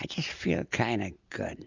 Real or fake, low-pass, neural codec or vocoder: real; 7.2 kHz; none